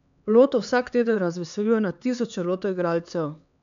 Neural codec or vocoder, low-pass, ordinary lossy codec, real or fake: codec, 16 kHz, 4 kbps, X-Codec, HuBERT features, trained on LibriSpeech; 7.2 kHz; none; fake